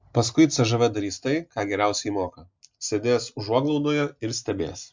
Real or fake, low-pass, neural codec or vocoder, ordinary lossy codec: real; 7.2 kHz; none; MP3, 64 kbps